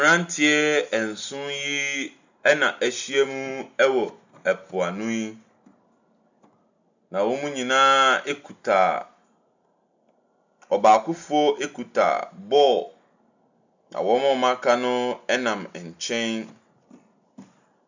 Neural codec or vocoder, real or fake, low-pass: none; real; 7.2 kHz